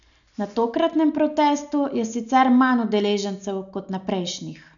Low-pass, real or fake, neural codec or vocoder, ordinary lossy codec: 7.2 kHz; real; none; none